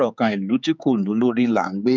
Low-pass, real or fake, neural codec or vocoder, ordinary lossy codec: none; fake; codec, 16 kHz, 4 kbps, X-Codec, HuBERT features, trained on general audio; none